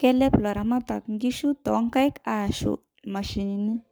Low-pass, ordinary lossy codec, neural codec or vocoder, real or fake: none; none; codec, 44.1 kHz, 7.8 kbps, Pupu-Codec; fake